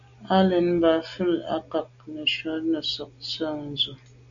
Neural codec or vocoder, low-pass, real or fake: none; 7.2 kHz; real